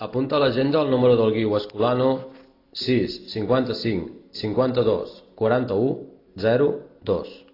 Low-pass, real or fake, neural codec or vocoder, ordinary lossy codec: 5.4 kHz; real; none; AAC, 32 kbps